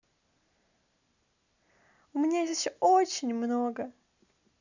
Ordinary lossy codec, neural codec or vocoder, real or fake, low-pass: none; none; real; 7.2 kHz